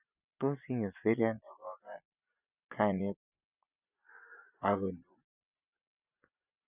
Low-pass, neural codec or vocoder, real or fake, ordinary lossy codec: 3.6 kHz; vocoder, 24 kHz, 100 mel bands, Vocos; fake; none